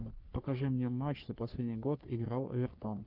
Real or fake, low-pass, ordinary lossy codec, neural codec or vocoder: fake; 5.4 kHz; Opus, 32 kbps; codec, 44.1 kHz, 3.4 kbps, Pupu-Codec